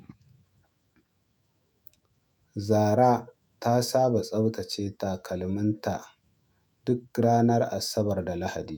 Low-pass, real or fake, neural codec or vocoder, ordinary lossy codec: none; fake; autoencoder, 48 kHz, 128 numbers a frame, DAC-VAE, trained on Japanese speech; none